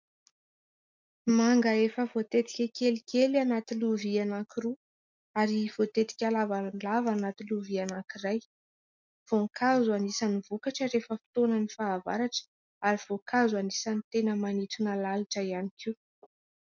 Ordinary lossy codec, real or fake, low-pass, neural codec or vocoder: MP3, 64 kbps; real; 7.2 kHz; none